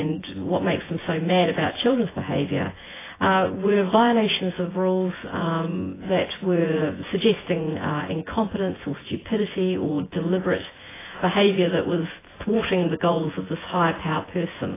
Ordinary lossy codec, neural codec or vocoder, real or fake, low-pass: AAC, 16 kbps; vocoder, 24 kHz, 100 mel bands, Vocos; fake; 3.6 kHz